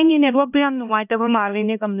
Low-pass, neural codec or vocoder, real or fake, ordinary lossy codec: 3.6 kHz; codec, 16 kHz, 1 kbps, X-Codec, HuBERT features, trained on LibriSpeech; fake; none